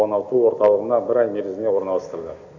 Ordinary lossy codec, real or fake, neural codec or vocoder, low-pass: none; real; none; 7.2 kHz